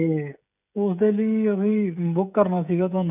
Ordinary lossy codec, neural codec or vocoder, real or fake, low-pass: none; codec, 16 kHz, 16 kbps, FreqCodec, smaller model; fake; 3.6 kHz